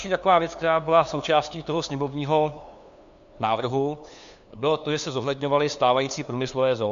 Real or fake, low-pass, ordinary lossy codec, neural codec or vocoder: fake; 7.2 kHz; MP3, 64 kbps; codec, 16 kHz, 2 kbps, FunCodec, trained on LibriTTS, 25 frames a second